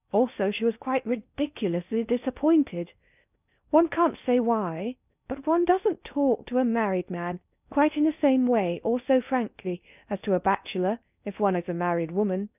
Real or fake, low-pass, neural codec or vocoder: fake; 3.6 kHz; codec, 16 kHz in and 24 kHz out, 0.6 kbps, FocalCodec, streaming, 2048 codes